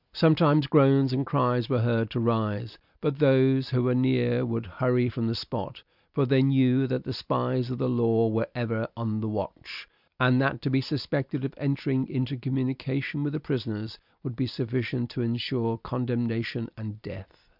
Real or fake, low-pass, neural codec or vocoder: real; 5.4 kHz; none